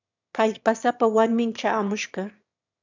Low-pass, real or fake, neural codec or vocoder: 7.2 kHz; fake; autoencoder, 22.05 kHz, a latent of 192 numbers a frame, VITS, trained on one speaker